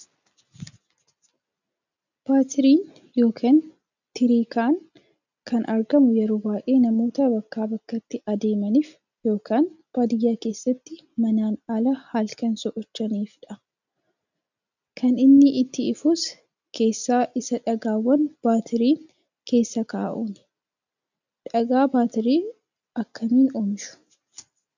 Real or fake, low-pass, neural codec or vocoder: real; 7.2 kHz; none